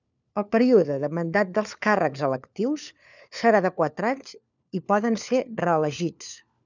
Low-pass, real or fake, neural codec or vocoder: 7.2 kHz; fake; codec, 16 kHz, 4 kbps, FunCodec, trained on LibriTTS, 50 frames a second